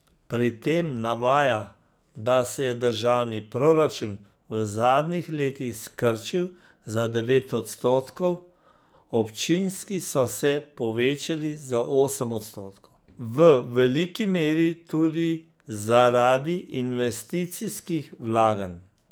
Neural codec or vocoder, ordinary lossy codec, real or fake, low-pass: codec, 44.1 kHz, 2.6 kbps, SNAC; none; fake; none